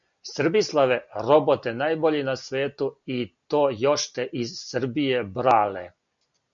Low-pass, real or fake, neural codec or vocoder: 7.2 kHz; real; none